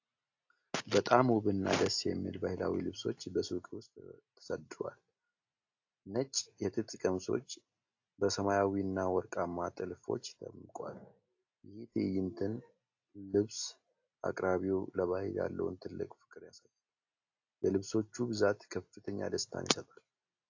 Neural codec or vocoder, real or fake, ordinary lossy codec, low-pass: none; real; AAC, 48 kbps; 7.2 kHz